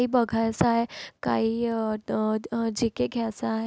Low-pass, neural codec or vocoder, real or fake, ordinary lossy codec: none; none; real; none